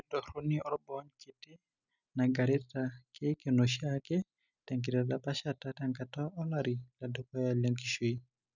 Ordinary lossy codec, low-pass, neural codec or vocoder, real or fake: none; 7.2 kHz; none; real